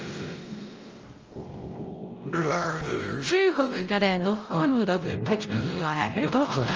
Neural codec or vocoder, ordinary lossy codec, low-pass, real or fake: codec, 16 kHz, 0.5 kbps, X-Codec, WavLM features, trained on Multilingual LibriSpeech; Opus, 24 kbps; 7.2 kHz; fake